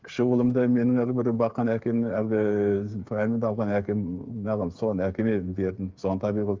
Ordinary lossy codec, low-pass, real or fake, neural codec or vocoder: Opus, 32 kbps; 7.2 kHz; fake; codec, 16 kHz, 8 kbps, FreqCodec, smaller model